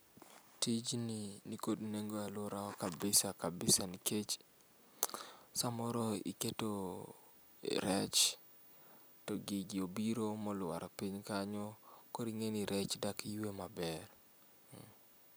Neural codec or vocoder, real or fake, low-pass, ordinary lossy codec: none; real; none; none